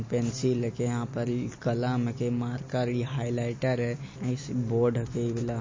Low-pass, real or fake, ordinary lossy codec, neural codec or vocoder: 7.2 kHz; real; MP3, 32 kbps; none